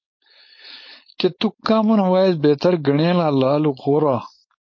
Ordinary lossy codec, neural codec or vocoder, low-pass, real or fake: MP3, 32 kbps; codec, 16 kHz, 4.8 kbps, FACodec; 7.2 kHz; fake